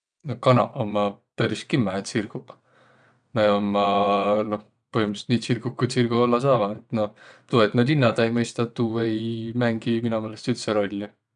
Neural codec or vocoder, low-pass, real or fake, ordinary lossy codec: vocoder, 22.05 kHz, 80 mel bands, WaveNeXt; 9.9 kHz; fake; none